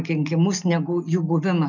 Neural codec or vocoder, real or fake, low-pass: none; real; 7.2 kHz